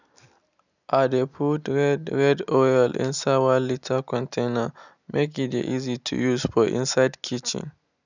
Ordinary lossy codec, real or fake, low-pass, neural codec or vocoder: none; real; 7.2 kHz; none